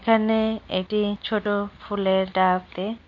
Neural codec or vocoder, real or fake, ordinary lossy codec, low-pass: none; real; MP3, 48 kbps; 7.2 kHz